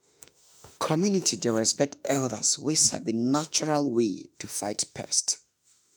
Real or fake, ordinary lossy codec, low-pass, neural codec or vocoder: fake; none; none; autoencoder, 48 kHz, 32 numbers a frame, DAC-VAE, trained on Japanese speech